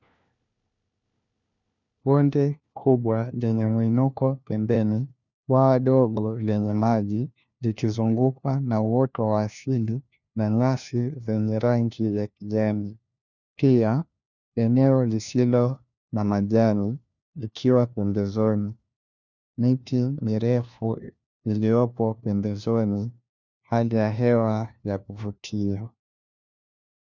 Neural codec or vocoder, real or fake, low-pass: codec, 16 kHz, 1 kbps, FunCodec, trained on LibriTTS, 50 frames a second; fake; 7.2 kHz